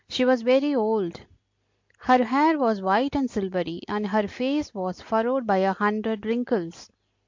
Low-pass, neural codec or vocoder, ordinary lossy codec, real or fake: 7.2 kHz; none; MP3, 48 kbps; real